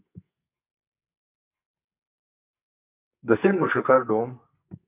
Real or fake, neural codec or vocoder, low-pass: fake; codec, 44.1 kHz, 2.6 kbps, SNAC; 3.6 kHz